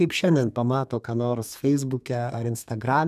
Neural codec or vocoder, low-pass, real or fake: codec, 32 kHz, 1.9 kbps, SNAC; 14.4 kHz; fake